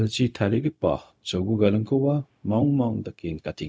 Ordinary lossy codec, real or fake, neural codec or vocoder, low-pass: none; fake; codec, 16 kHz, 0.4 kbps, LongCat-Audio-Codec; none